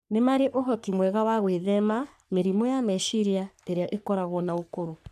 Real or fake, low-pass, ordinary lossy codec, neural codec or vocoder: fake; 14.4 kHz; none; codec, 44.1 kHz, 3.4 kbps, Pupu-Codec